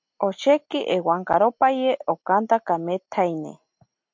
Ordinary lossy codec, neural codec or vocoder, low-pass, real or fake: MP3, 48 kbps; none; 7.2 kHz; real